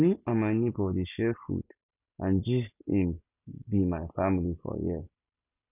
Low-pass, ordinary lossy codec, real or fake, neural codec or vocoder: 3.6 kHz; none; real; none